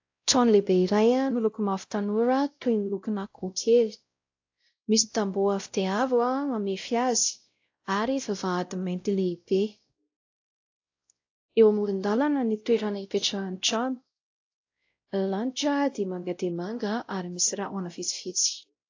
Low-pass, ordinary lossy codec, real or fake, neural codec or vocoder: 7.2 kHz; AAC, 48 kbps; fake; codec, 16 kHz, 0.5 kbps, X-Codec, WavLM features, trained on Multilingual LibriSpeech